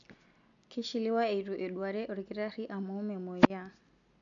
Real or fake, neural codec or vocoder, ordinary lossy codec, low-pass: real; none; none; 7.2 kHz